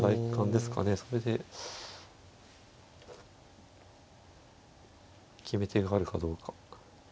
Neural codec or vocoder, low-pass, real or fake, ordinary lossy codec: none; none; real; none